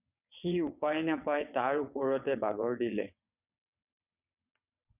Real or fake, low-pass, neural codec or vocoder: fake; 3.6 kHz; vocoder, 22.05 kHz, 80 mel bands, WaveNeXt